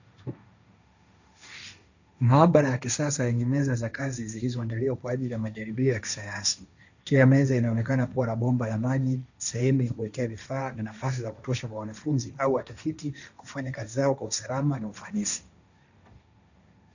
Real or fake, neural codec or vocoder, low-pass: fake; codec, 16 kHz, 1.1 kbps, Voila-Tokenizer; 7.2 kHz